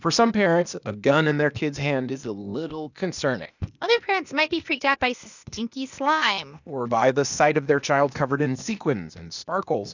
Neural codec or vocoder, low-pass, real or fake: codec, 16 kHz, 0.8 kbps, ZipCodec; 7.2 kHz; fake